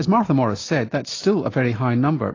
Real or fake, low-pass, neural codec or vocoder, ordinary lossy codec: real; 7.2 kHz; none; AAC, 32 kbps